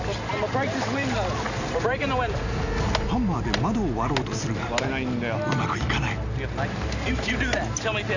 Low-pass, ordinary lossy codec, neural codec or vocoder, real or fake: 7.2 kHz; none; none; real